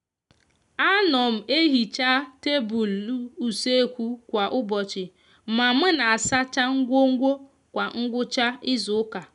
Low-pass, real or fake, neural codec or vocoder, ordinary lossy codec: 10.8 kHz; real; none; none